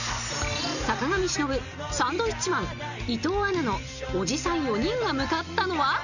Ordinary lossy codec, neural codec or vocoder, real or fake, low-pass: none; none; real; 7.2 kHz